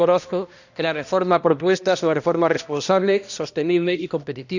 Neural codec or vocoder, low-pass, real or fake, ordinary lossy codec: codec, 16 kHz, 1 kbps, X-Codec, HuBERT features, trained on balanced general audio; 7.2 kHz; fake; none